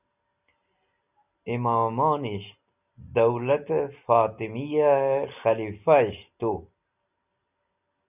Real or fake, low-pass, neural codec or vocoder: real; 3.6 kHz; none